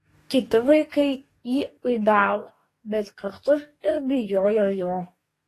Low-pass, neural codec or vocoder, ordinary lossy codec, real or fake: 14.4 kHz; codec, 44.1 kHz, 2.6 kbps, DAC; AAC, 48 kbps; fake